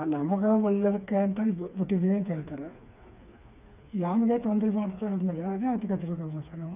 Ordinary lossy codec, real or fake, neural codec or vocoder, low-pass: none; fake; codec, 16 kHz, 4 kbps, FreqCodec, smaller model; 3.6 kHz